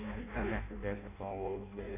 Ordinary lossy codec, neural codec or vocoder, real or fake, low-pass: none; codec, 16 kHz in and 24 kHz out, 0.6 kbps, FireRedTTS-2 codec; fake; 3.6 kHz